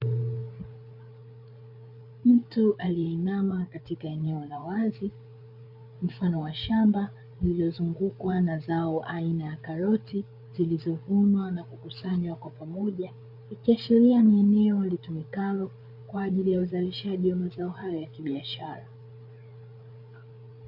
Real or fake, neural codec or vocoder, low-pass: fake; codec, 16 kHz, 8 kbps, FreqCodec, larger model; 5.4 kHz